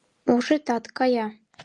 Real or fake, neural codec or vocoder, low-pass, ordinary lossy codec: real; none; 10.8 kHz; Opus, 32 kbps